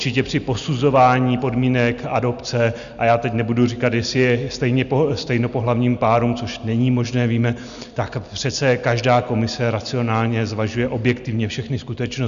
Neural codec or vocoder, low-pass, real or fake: none; 7.2 kHz; real